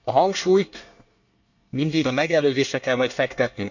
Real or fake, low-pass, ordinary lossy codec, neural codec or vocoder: fake; 7.2 kHz; none; codec, 24 kHz, 1 kbps, SNAC